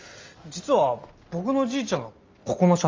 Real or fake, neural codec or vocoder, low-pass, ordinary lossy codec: real; none; 7.2 kHz; Opus, 32 kbps